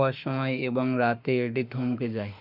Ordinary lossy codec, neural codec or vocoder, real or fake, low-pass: none; autoencoder, 48 kHz, 32 numbers a frame, DAC-VAE, trained on Japanese speech; fake; 5.4 kHz